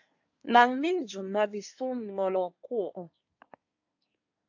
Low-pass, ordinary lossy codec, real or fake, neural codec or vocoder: 7.2 kHz; AAC, 48 kbps; fake; codec, 24 kHz, 1 kbps, SNAC